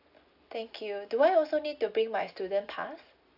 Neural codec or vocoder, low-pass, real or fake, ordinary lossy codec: none; 5.4 kHz; real; AAC, 48 kbps